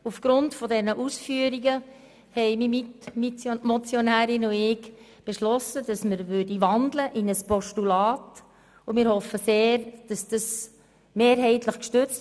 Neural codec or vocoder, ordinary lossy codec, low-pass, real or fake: none; none; none; real